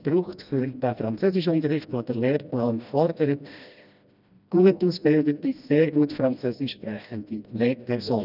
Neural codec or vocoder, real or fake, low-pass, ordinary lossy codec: codec, 16 kHz, 1 kbps, FreqCodec, smaller model; fake; 5.4 kHz; none